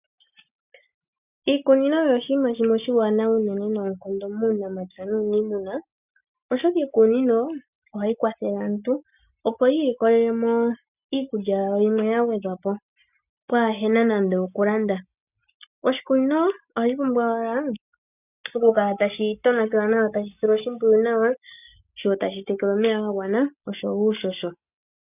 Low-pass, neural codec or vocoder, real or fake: 3.6 kHz; none; real